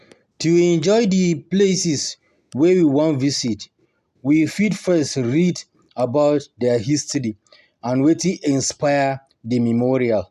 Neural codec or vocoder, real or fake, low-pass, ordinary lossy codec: none; real; 14.4 kHz; none